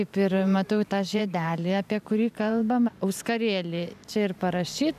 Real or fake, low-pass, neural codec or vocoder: fake; 14.4 kHz; vocoder, 44.1 kHz, 128 mel bands every 512 samples, BigVGAN v2